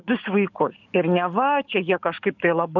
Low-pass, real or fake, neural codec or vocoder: 7.2 kHz; fake; codec, 44.1 kHz, 7.8 kbps, DAC